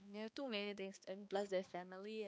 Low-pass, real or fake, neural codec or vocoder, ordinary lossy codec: none; fake; codec, 16 kHz, 2 kbps, X-Codec, HuBERT features, trained on balanced general audio; none